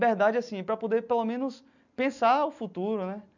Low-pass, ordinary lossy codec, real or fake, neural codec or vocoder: 7.2 kHz; none; real; none